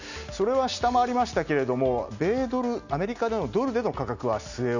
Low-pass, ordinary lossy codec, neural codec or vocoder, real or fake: 7.2 kHz; none; none; real